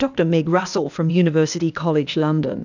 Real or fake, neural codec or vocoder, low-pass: fake; codec, 16 kHz, 0.8 kbps, ZipCodec; 7.2 kHz